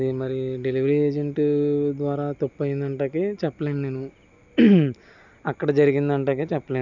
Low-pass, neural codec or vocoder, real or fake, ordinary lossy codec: 7.2 kHz; none; real; none